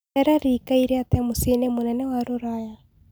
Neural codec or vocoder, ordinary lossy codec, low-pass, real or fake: none; none; none; real